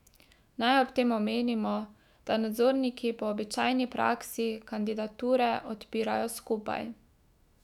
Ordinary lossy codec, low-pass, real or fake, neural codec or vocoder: none; 19.8 kHz; fake; autoencoder, 48 kHz, 128 numbers a frame, DAC-VAE, trained on Japanese speech